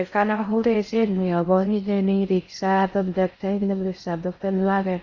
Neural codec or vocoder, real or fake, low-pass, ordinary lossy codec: codec, 16 kHz in and 24 kHz out, 0.6 kbps, FocalCodec, streaming, 4096 codes; fake; 7.2 kHz; Opus, 64 kbps